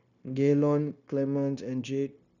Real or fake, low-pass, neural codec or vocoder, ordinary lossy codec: fake; 7.2 kHz; codec, 16 kHz, 0.9 kbps, LongCat-Audio-Codec; none